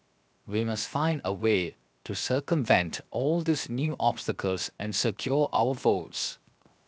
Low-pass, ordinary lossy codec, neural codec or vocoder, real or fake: none; none; codec, 16 kHz, 0.7 kbps, FocalCodec; fake